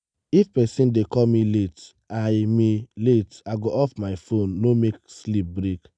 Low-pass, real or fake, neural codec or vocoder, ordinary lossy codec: 9.9 kHz; real; none; none